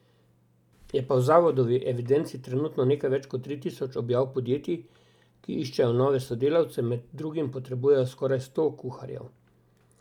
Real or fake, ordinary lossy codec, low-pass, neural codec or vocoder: real; none; 19.8 kHz; none